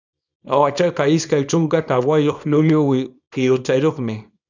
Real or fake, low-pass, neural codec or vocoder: fake; 7.2 kHz; codec, 24 kHz, 0.9 kbps, WavTokenizer, small release